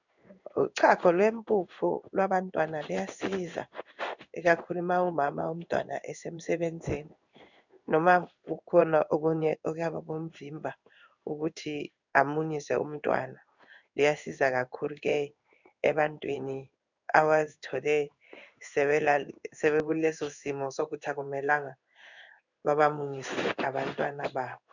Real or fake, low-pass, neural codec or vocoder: fake; 7.2 kHz; codec, 16 kHz in and 24 kHz out, 1 kbps, XY-Tokenizer